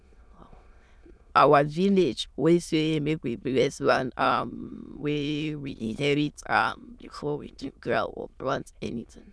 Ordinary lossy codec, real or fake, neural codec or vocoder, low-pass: none; fake; autoencoder, 22.05 kHz, a latent of 192 numbers a frame, VITS, trained on many speakers; none